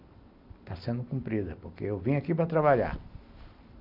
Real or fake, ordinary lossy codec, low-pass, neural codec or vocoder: real; none; 5.4 kHz; none